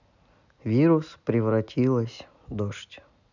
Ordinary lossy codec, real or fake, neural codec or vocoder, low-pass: none; real; none; 7.2 kHz